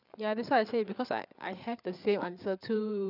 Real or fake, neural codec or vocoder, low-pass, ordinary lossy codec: fake; codec, 16 kHz, 8 kbps, FreqCodec, larger model; 5.4 kHz; none